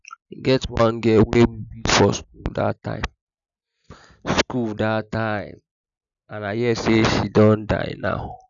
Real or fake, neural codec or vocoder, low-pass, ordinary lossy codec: real; none; 7.2 kHz; none